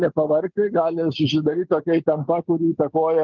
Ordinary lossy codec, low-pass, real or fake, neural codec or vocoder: Opus, 32 kbps; 7.2 kHz; real; none